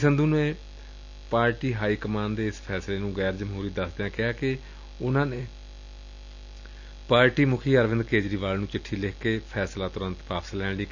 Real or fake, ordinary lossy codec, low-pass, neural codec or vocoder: real; none; 7.2 kHz; none